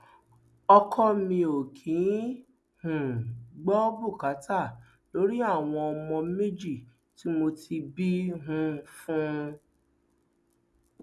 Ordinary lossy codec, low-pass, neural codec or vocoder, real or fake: none; none; none; real